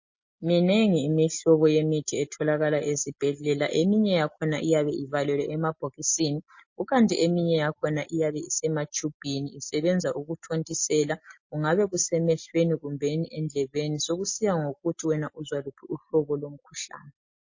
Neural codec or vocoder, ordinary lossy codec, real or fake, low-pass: none; MP3, 32 kbps; real; 7.2 kHz